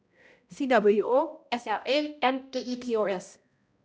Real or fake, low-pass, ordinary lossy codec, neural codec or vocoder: fake; none; none; codec, 16 kHz, 0.5 kbps, X-Codec, HuBERT features, trained on balanced general audio